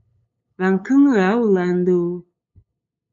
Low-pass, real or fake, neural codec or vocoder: 7.2 kHz; fake; codec, 16 kHz, 8 kbps, FunCodec, trained on LibriTTS, 25 frames a second